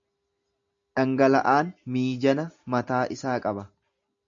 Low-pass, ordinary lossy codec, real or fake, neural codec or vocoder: 7.2 kHz; MP3, 96 kbps; real; none